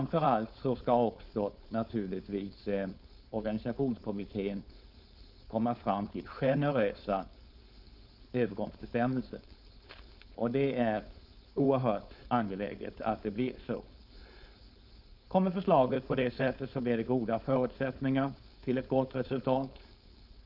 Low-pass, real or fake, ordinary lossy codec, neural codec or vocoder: 5.4 kHz; fake; none; codec, 16 kHz, 4.8 kbps, FACodec